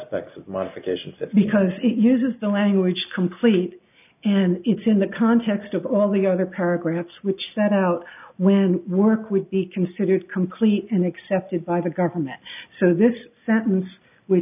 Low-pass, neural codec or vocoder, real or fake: 3.6 kHz; none; real